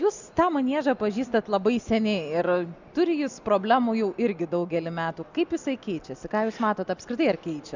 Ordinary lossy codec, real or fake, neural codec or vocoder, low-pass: Opus, 64 kbps; real; none; 7.2 kHz